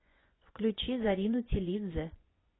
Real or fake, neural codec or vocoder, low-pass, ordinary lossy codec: fake; vocoder, 24 kHz, 100 mel bands, Vocos; 7.2 kHz; AAC, 16 kbps